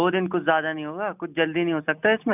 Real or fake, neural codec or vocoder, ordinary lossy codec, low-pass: real; none; none; 3.6 kHz